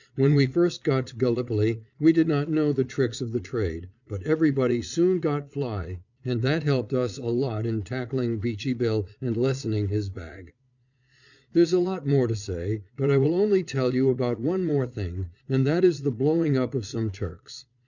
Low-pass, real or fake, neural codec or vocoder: 7.2 kHz; fake; vocoder, 22.05 kHz, 80 mel bands, Vocos